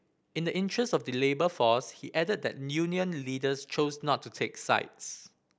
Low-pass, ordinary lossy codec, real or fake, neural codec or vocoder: none; none; real; none